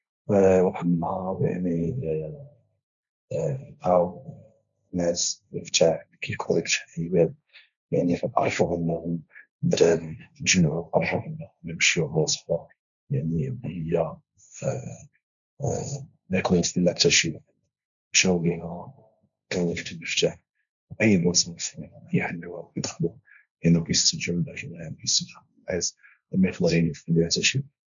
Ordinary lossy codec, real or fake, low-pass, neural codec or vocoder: none; fake; 7.2 kHz; codec, 16 kHz, 1.1 kbps, Voila-Tokenizer